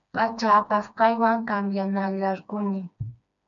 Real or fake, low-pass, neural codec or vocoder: fake; 7.2 kHz; codec, 16 kHz, 2 kbps, FreqCodec, smaller model